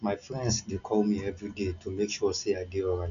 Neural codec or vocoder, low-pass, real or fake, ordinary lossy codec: none; 7.2 kHz; real; none